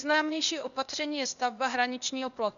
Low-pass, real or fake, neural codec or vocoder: 7.2 kHz; fake; codec, 16 kHz, 0.8 kbps, ZipCodec